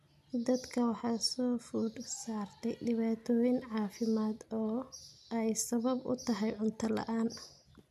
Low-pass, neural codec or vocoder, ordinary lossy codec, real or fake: 14.4 kHz; none; none; real